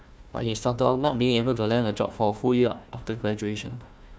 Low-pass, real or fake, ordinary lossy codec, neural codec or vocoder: none; fake; none; codec, 16 kHz, 1 kbps, FunCodec, trained on Chinese and English, 50 frames a second